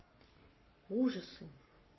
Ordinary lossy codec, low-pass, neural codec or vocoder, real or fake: MP3, 24 kbps; 7.2 kHz; codec, 44.1 kHz, 7.8 kbps, Pupu-Codec; fake